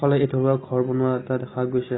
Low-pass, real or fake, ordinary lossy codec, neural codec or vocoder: 7.2 kHz; real; AAC, 16 kbps; none